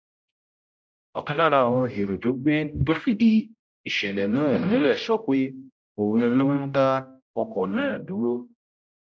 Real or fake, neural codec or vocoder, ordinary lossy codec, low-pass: fake; codec, 16 kHz, 0.5 kbps, X-Codec, HuBERT features, trained on general audio; none; none